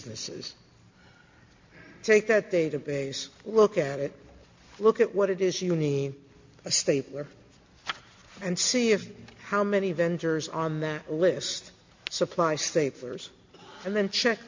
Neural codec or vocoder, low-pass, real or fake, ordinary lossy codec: none; 7.2 kHz; real; MP3, 48 kbps